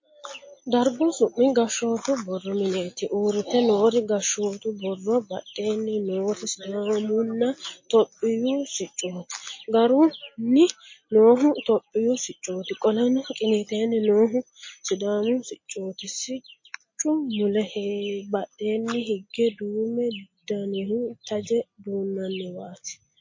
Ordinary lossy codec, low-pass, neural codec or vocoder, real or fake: MP3, 32 kbps; 7.2 kHz; none; real